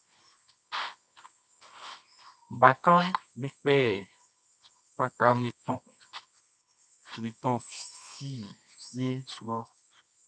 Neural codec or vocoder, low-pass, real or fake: codec, 24 kHz, 0.9 kbps, WavTokenizer, medium music audio release; 9.9 kHz; fake